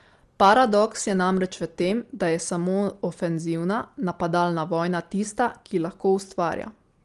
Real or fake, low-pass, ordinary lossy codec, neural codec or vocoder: real; 10.8 kHz; Opus, 24 kbps; none